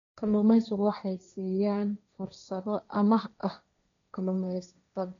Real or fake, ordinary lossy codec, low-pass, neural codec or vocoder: fake; none; 7.2 kHz; codec, 16 kHz, 1.1 kbps, Voila-Tokenizer